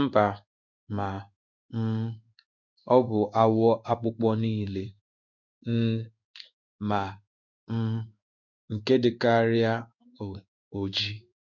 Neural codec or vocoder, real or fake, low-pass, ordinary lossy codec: codec, 16 kHz in and 24 kHz out, 1 kbps, XY-Tokenizer; fake; 7.2 kHz; none